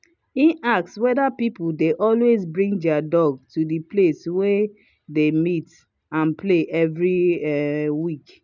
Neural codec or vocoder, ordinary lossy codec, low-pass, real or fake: none; none; 7.2 kHz; real